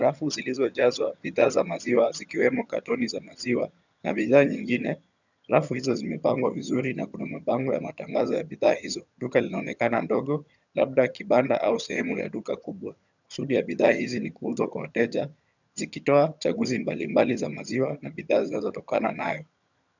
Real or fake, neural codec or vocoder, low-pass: fake; vocoder, 22.05 kHz, 80 mel bands, HiFi-GAN; 7.2 kHz